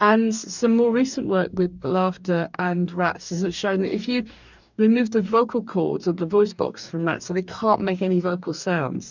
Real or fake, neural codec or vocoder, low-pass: fake; codec, 44.1 kHz, 2.6 kbps, DAC; 7.2 kHz